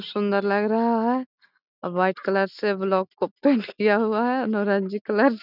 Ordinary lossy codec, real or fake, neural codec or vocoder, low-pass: none; real; none; 5.4 kHz